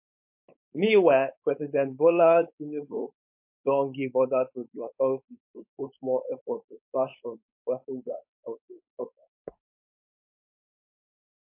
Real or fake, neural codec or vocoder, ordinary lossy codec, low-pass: fake; codec, 16 kHz, 4.8 kbps, FACodec; MP3, 32 kbps; 3.6 kHz